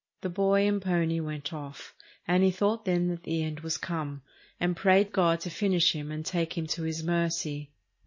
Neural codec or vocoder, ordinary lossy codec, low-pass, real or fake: none; MP3, 32 kbps; 7.2 kHz; real